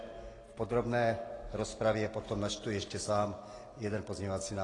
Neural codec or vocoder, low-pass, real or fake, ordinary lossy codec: none; 10.8 kHz; real; AAC, 32 kbps